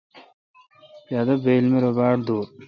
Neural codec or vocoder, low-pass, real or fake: none; 7.2 kHz; real